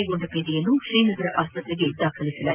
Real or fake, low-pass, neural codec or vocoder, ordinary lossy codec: real; 3.6 kHz; none; Opus, 32 kbps